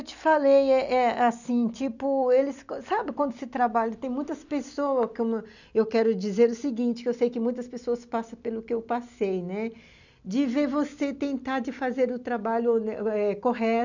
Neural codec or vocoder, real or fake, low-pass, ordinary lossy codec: none; real; 7.2 kHz; none